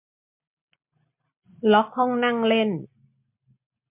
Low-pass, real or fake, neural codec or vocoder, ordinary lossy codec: 3.6 kHz; real; none; MP3, 32 kbps